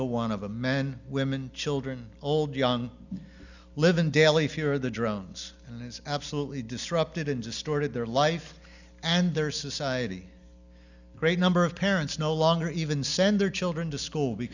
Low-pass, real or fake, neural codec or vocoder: 7.2 kHz; real; none